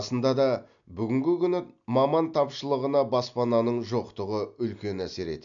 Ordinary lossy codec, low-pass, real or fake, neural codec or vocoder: none; 7.2 kHz; real; none